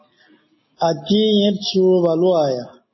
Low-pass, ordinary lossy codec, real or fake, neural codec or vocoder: 7.2 kHz; MP3, 24 kbps; real; none